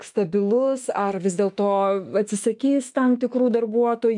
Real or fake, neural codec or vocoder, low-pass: fake; autoencoder, 48 kHz, 32 numbers a frame, DAC-VAE, trained on Japanese speech; 10.8 kHz